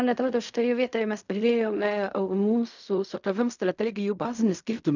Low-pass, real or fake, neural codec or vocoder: 7.2 kHz; fake; codec, 16 kHz in and 24 kHz out, 0.4 kbps, LongCat-Audio-Codec, fine tuned four codebook decoder